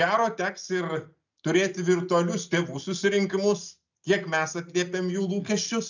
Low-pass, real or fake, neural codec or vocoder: 7.2 kHz; real; none